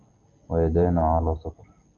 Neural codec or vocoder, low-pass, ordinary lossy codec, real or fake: none; 7.2 kHz; Opus, 32 kbps; real